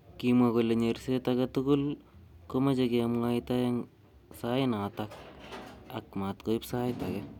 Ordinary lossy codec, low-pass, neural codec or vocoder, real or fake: none; 19.8 kHz; none; real